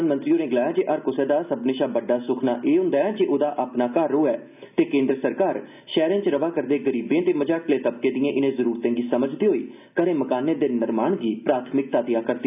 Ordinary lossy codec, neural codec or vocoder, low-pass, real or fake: none; none; 3.6 kHz; real